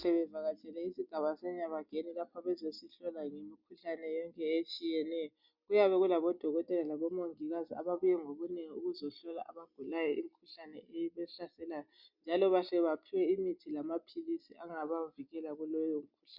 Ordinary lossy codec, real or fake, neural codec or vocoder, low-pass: MP3, 48 kbps; real; none; 5.4 kHz